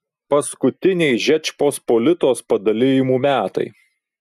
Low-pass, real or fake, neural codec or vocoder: 14.4 kHz; real; none